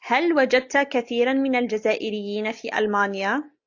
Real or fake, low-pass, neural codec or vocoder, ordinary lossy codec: real; 7.2 kHz; none; AAC, 48 kbps